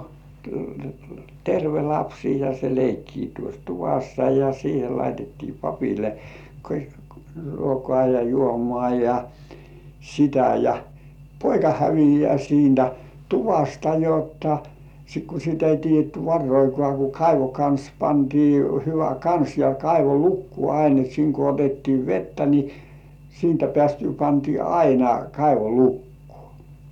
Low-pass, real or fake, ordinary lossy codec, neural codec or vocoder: 19.8 kHz; real; none; none